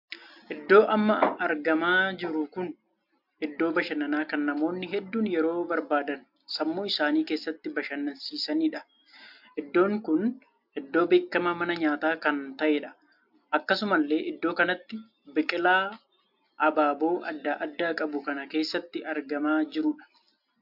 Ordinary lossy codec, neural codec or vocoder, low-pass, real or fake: MP3, 48 kbps; none; 5.4 kHz; real